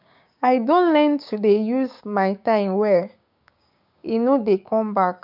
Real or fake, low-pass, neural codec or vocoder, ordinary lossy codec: fake; 5.4 kHz; codec, 16 kHz, 6 kbps, DAC; none